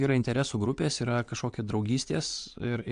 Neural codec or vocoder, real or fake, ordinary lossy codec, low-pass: vocoder, 22.05 kHz, 80 mel bands, WaveNeXt; fake; MP3, 64 kbps; 9.9 kHz